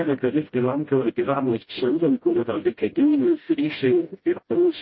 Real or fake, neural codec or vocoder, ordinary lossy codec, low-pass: fake; codec, 16 kHz, 0.5 kbps, FreqCodec, smaller model; MP3, 24 kbps; 7.2 kHz